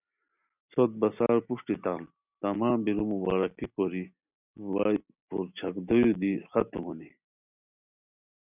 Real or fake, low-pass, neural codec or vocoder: real; 3.6 kHz; none